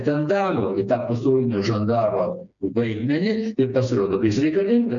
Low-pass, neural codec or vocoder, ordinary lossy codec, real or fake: 7.2 kHz; codec, 16 kHz, 2 kbps, FreqCodec, smaller model; MP3, 96 kbps; fake